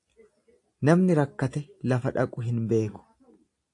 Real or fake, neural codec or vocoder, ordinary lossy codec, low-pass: real; none; AAC, 48 kbps; 10.8 kHz